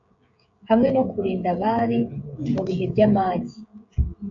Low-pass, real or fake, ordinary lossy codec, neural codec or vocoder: 7.2 kHz; fake; AAC, 48 kbps; codec, 16 kHz, 16 kbps, FreqCodec, smaller model